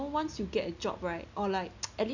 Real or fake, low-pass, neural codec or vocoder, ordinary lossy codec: real; 7.2 kHz; none; none